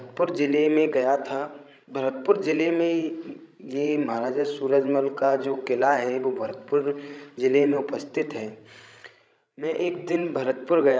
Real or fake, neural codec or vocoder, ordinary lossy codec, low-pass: fake; codec, 16 kHz, 16 kbps, FreqCodec, larger model; none; none